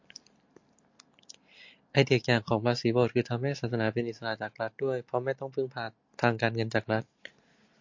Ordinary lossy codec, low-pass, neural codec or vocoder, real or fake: MP3, 48 kbps; 7.2 kHz; none; real